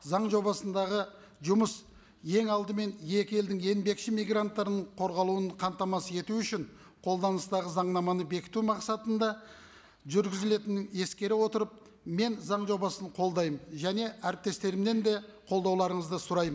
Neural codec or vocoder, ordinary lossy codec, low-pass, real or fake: none; none; none; real